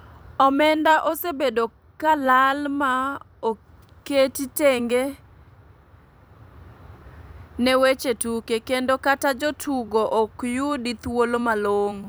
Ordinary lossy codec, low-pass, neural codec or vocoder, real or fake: none; none; vocoder, 44.1 kHz, 128 mel bands every 256 samples, BigVGAN v2; fake